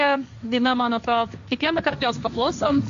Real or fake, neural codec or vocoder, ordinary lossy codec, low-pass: fake; codec, 16 kHz, 1.1 kbps, Voila-Tokenizer; AAC, 64 kbps; 7.2 kHz